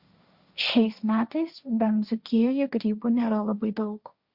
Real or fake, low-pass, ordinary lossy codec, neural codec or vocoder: fake; 5.4 kHz; Opus, 64 kbps; codec, 16 kHz, 1.1 kbps, Voila-Tokenizer